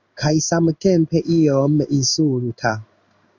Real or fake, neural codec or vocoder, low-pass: fake; codec, 16 kHz in and 24 kHz out, 1 kbps, XY-Tokenizer; 7.2 kHz